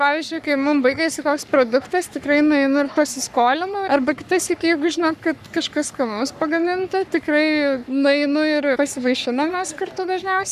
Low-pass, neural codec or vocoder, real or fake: 14.4 kHz; codec, 44.1 kHz, 3.4 kbps, Pupu-Codec; fake